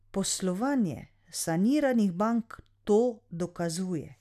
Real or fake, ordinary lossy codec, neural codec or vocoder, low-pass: real; none; none; 14.4 kHz